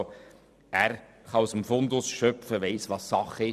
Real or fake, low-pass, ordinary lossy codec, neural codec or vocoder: fake; 14.4 kHz; none; vocoder, 44.1 kHz, 128 mel bands every 256 samples, BigVGAN v2